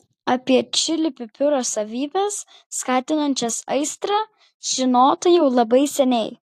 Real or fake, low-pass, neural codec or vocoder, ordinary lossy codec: fake; 14.4 kHz; vocoder, 44.1 kHz, 128 mel bands every 256 samples, BigVGAN v2; AAC, 64 kbps